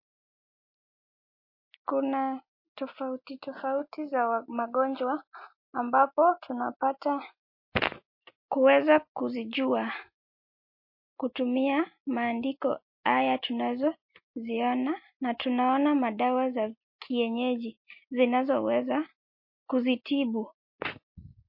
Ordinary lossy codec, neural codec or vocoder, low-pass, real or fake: MP3, 32 kbps; none; 5.4 kHz; real